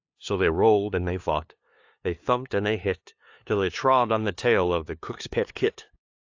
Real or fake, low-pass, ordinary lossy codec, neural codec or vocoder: fake; 7.2 kHz; AAC, 48 kbps; codec, 16 kHz, 2 kbps, FunCodec, trained on LibriTTS, 25 frames a second